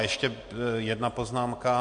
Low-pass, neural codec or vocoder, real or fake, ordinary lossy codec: 9.9 kHz; none; real; MP3, 48 kbps